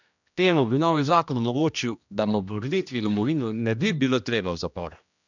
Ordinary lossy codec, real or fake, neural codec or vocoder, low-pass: none; fake; codec, 16 kHz, 1 kbps, X-Codec, HuBERT features, trained on general audio; 7.2 kHz